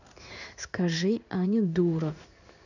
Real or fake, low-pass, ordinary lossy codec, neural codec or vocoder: fake; 7.2 kHz; none; codec, 16 kHz in and 24 kHz out, 1 kbps, XY-Tokenizer